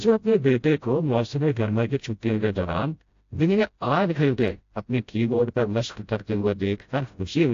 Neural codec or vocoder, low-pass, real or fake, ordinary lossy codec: codec, 16 kHz, 0.5 kbps, FreqCodec, smaller model; 7.2 kHz; fake; AAC, 48 kbps